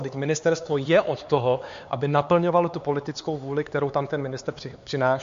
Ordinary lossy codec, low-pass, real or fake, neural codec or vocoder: MP3, 48 kbps; 7.2 kHz; fake; codec, 16 kHz, 4 kbps, X-Codec, HuBERT features, trained on LibriSpeech